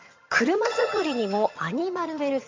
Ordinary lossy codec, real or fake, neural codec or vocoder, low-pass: MP3, 48 kbps; fake; vocoder, 22.05 kHz, 80 mel bands, HiFi-GAN; 7.2 kHz